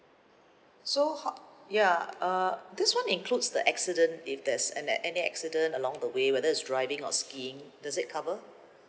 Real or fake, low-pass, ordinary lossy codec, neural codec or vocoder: real; none; none; none